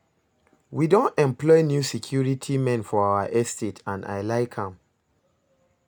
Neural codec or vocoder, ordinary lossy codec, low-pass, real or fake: none; none; none; real